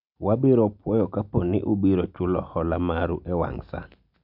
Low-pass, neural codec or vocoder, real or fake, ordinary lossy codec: 5.4 kHz; none; real; none